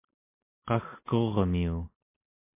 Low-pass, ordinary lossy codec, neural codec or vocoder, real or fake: 3.6 kHz; MP3, 24 kbps; none; real